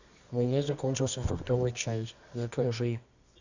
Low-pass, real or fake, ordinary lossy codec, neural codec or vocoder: 7.2 kHz; fake; Opus, 64 kbps; codec, 24 kHz, 0.9 kbps, WavTokenizer, medium music audio release